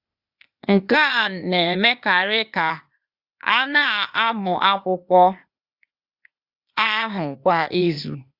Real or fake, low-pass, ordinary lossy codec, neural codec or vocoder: fake; 5.4 kHz; Opus, 64 kbps; codec, 16 kHz, 0.8 kbps, ZipCodec